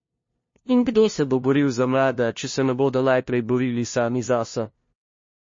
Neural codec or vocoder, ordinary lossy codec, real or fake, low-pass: codec, 16 kHz, 0.5 kbps, FunCodec, trained on LibriTTS, 25 frames a second; MP3, 32 kbps; fake; 7.2 kHz